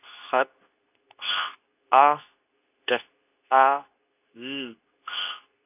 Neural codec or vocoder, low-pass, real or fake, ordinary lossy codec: codec, 24 kHz, 0.9 kbps, WavTokenizer, medium speech release version 2; 3.6 kHz; fake; none